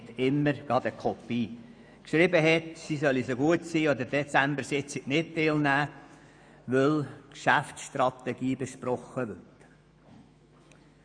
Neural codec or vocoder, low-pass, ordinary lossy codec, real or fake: autoencoder, 48 kHz, 128 numbers a frame, DAC-VAE, trained on Japanese speech; 9.9 kHz; none; fake